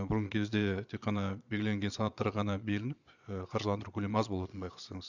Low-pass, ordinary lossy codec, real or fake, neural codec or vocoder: 7.2 kHz; none; fake; vocoder, 22.05 kHz, 80 mel bands, Vocos